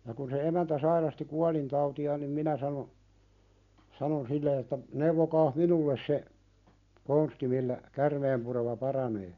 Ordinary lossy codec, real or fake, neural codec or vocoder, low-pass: none; real; none; 7.2 kHz